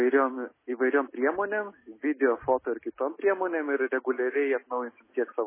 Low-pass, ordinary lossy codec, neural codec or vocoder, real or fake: 3.6 kHz; MP3, 16 kbps; none; real